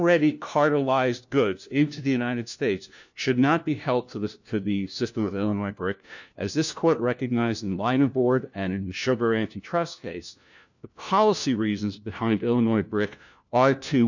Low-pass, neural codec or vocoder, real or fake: 7.2 kHz; codec, 16 kHz, 1 kbps, FunCodec, trained on LibriTTS, 50 frames a second; fake